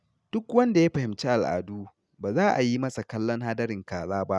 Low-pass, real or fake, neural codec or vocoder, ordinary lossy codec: none; real; none; none